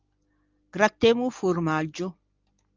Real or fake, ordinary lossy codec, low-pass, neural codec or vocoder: real; Opus, 32 kbps; 7.2 kHz; none